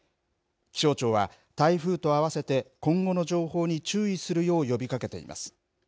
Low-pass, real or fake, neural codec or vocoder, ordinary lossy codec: none; real; none; none